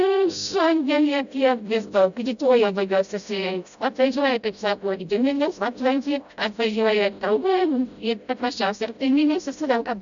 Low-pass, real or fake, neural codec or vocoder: 7.2 kHz; fake; codec, 16 kHz, 0.5 kbps, FreqCodec, smaller model